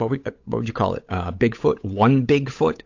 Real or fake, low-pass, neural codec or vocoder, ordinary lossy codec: fake; 7.2 kHz; codec, 16 kHz, 8 kbps, FunCodec, trained on Chinese and English, 25 frames a second; AAC, 48 kbps